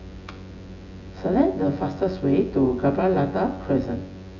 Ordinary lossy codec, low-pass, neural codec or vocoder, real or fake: none; 7.2 kHz; vocoder, 24 kHz, 100 mel bands, Vocos; fake